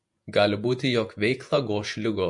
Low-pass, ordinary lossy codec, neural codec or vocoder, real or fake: 10.8 kHz; MP3, 48 kbps; vocoder, 48 kHz, 128 mel bands, Vocos; fake